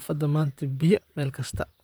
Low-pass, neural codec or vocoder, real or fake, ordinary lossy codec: none; vocoder, 44.1 kHz, 128 mel bands, Pupu-Vocoder; fake; none